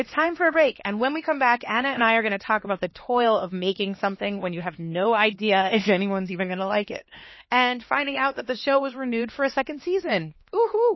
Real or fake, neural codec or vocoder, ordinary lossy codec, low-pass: fake; codec, 16 kHz, 2 kbps, X-Codec, HuBERT features, trained on LibriSpeech; MP3, 24 kbps; 7.2 kHz